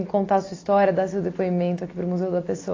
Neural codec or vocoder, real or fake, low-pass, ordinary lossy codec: none; real; 7.2 kHz; AAC, 32 kbps